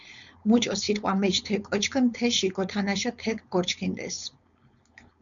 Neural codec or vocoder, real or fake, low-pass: codec, 16 kHz, 4.8 kbps, FACodec; fake; 7.2 kHz